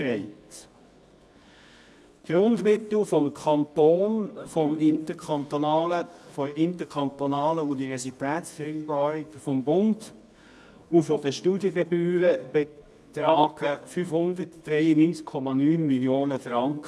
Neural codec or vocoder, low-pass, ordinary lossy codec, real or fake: codec, 24 kHz, 0.9 kbps, WavTokenizer, medium music audio release; none; none; fake